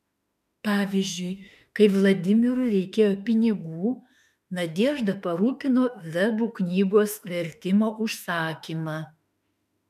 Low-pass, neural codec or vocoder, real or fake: 14.4 kHz; autoencoder, 48 kHz, 32 numbers a frame, DAC-VAE, trained on Japanese speech; fake